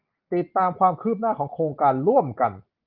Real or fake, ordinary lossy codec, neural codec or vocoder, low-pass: real; Opus, 32 kbps; none; 5.4 kHz